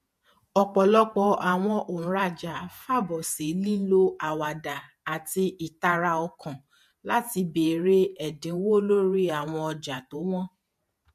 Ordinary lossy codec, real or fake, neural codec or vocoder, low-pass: MP3, 64 kbps; fake; vocoder, 48 kHz, 128 mel bands, Vocos; 14.4 kHz